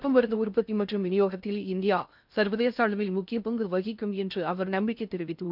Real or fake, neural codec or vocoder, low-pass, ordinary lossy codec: fake; codec, 16 kHz in and 24 kHz out, 0.6 kbps, FocalCodec, streaming, 2048 codes; 5.4 kHz; none